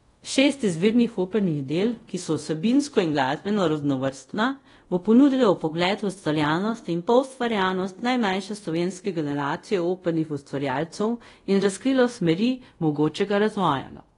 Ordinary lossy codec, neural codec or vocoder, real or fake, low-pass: AAC, 32 kbps; codec, 24 kHz, 0.5 kbps, DualCodec; fake; 10.8 kHz